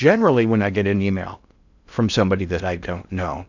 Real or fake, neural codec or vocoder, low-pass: fake; codec, 16 kHz in and 24 kHz out, 0.6 kbps, FocalCodec, streaming, 2048 codes; 7.2 kHz